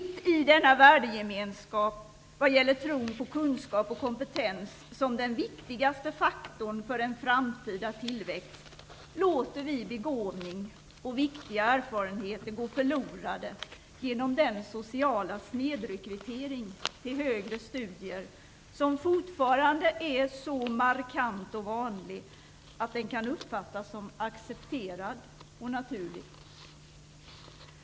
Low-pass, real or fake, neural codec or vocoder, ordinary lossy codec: none; real; none; none